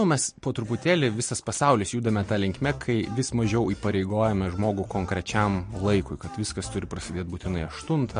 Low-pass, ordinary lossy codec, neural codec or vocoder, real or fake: 9.9 kHz; MP3, 48 kbps; none; real